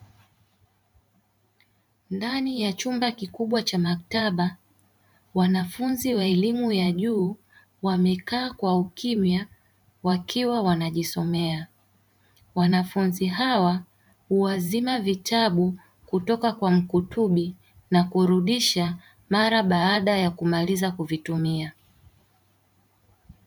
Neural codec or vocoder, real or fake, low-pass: vocoder, 44.1 kHz, 128 mel bands every 512 samples, BigVGAN v2; fake; 19.8 kHz